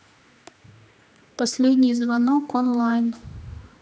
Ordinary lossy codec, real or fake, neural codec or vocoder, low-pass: none; fake; codec, 16 kHz, 2 kbps, X-Codec, HuBERT features, trained on general audio; none